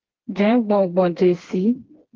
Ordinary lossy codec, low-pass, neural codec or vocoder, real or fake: Opus, 16 kbps; 7.2 kHz; codec, 16 kHz, 2 kbps, FreqCodec, smaller model; fake